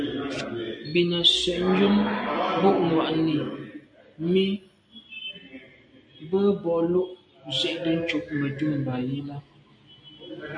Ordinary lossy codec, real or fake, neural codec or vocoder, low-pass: MP3, 64 kbps; real; none; 9.9 kHz